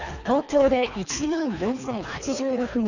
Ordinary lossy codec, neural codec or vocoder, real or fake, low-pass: none; codec, 24 kHz, 3 kbps, HILCodec; fake; 7.2 kHz